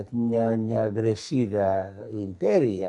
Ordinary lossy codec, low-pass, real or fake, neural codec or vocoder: AAC, 64 kbps; 10.8 kHz; fake; codec, 44.1 kHz, 2.6 kbps, SNAC